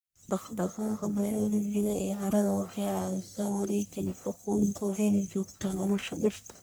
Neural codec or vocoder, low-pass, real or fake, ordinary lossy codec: codec, 44.1 kHz, 1.7 kbps, Pupu-Codec; none; fake; none